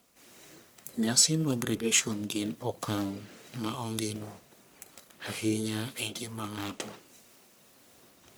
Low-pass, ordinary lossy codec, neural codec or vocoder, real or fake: none; none; codec, 44.1 kHz, 1.7 kbps, Pupu-Codec; fake